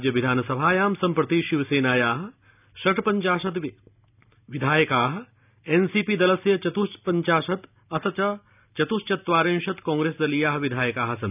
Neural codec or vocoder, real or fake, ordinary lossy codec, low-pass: none; real; none; 3.6 kHz